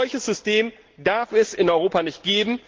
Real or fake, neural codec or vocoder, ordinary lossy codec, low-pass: real; none; Opus, 16 kbps; 7.2 kHz